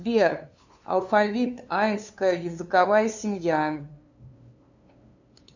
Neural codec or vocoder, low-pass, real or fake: codec, 16 kHz, 2 kbps, FunCodec, trained on LibriTTS, 25 frames a second; 7.2 kHz; fake